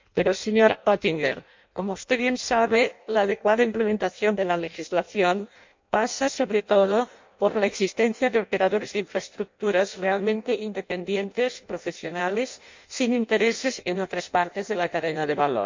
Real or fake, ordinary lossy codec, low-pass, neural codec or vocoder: fake; MP3, 64 kbps; 7.2 kHz; codec, 16 kHz in and 24 kHz out, 0.6 kbps, FireRedTTS-2 codec